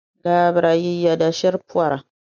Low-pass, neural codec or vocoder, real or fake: 7.2 kHz; none; real